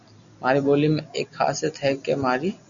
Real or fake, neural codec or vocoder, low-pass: real; none; 7.2 kHz